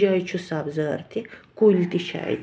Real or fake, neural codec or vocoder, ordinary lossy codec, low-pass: real; none; none; none